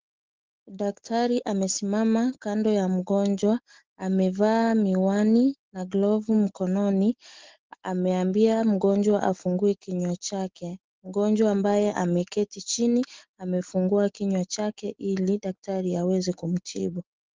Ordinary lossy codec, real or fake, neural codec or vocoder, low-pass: Opus, 16 kbps; real; none; 7.2 kHz